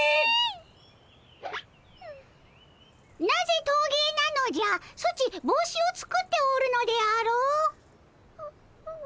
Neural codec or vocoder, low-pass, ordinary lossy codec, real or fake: none; none; none; real